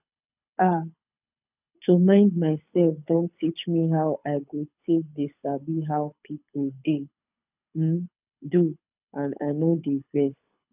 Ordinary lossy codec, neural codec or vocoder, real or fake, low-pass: none; codec, 24 kHz, 6 kbps, HILCodec; fake; 3.6 kHz